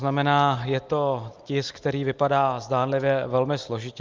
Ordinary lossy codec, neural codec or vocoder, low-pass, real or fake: Opus, 32 kbps; none; 7.2 kHz; real